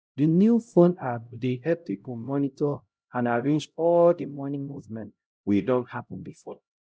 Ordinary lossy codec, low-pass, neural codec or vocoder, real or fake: none; none; codec, 16 kHz, 0.5 kbps, X-Codec, HuBERT features, trained on LibriSpeech; fake